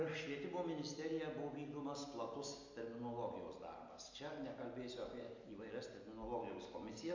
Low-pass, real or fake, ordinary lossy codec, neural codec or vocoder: 7.2 kHz; real; MP3, 48 kbps; none